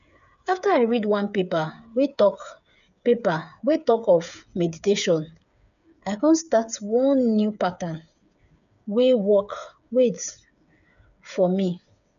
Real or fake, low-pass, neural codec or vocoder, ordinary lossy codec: fake; 7.2 kHz; codec, 16 kHz, 8 kbps, FreqCodec, smaller model; none